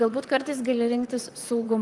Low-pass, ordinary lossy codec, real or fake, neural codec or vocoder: 10.8 kHz; Opus, 32 kbps; fake; vocoder, 44.1 kHz, 128 mel bands, Pupu-Vocoder